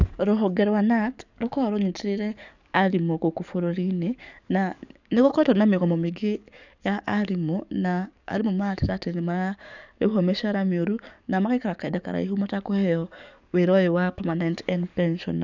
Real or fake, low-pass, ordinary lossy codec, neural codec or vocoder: fake; 7.2 kHz; none; codec, 44.1 kHz, 7.8 kbps, DAC